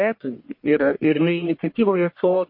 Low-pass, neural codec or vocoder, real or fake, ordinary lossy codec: 5.4 kHz; codec, 44.1 kHz, 1.7 kbps, Pupu-Codec; fake; MP3, 32 kbps